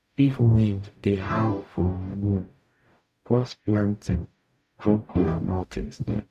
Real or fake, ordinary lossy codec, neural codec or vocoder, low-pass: fake; none; codec, 44.1 kHz, 0.9 kbps, DAC; 14.4 kHz